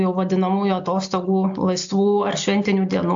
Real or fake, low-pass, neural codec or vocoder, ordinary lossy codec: real; 7.2 kHz; none; AAC, 64 kbps